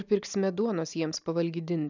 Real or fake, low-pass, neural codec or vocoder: real; 7.2 kHz; none